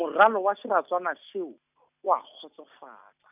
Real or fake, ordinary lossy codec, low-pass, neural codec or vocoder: real; none; 3.6 kHz; none